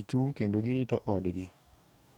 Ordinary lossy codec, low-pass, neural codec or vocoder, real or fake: none; 19.8 kHz; codec, 44.1 kHz, 2.6 kbps, DAC; fake